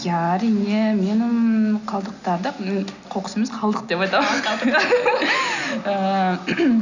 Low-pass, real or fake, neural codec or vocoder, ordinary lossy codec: 7.2 kHz; real; none; none